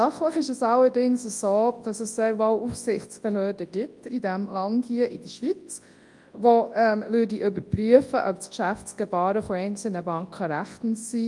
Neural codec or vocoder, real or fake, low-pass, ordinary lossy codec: codec, 24 kHz, 0.9 kbps, WavTokenizer, large speech release; fake; 10.8 kHz; Opus, 32 kbps